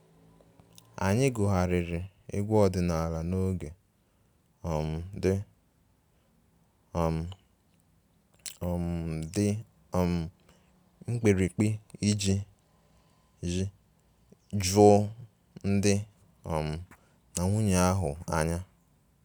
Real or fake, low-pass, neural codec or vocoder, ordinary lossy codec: real; none; none; none